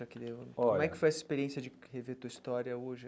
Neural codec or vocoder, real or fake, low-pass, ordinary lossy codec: none; real; none; none